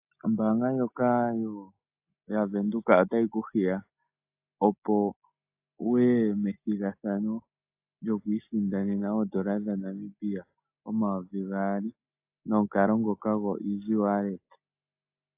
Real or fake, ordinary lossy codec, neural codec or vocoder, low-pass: real; AAC, 32 kbps; none; 3.6 kHz